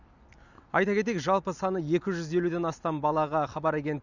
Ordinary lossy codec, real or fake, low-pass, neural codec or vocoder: none; real; 7.2 kHz; none